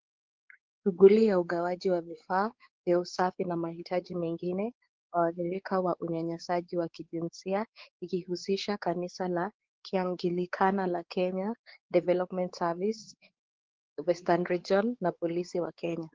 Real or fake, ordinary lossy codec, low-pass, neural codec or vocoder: fake; Opus, 16 kbps; 7.2 kHz; codec, 16 kHz, 4 kbps, X-Codec, WavLM features, trained on Multilingual LibriSpeech